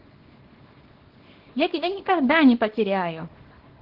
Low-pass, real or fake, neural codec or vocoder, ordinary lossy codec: 5.4 kHz; fake; codec, 24 kHz, 0.9 kbps, WavTokenizer, small release; Opus, 16 kbps